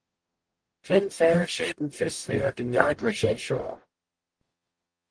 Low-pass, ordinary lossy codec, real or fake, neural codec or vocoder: 9.9 kHz; Opus, 24 kbps; fake; codec, 44.1 kHz, 0.9 kbps, DAC